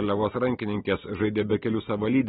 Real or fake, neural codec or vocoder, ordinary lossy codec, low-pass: real; none; AAC, 16 kbps; 19.8 kHz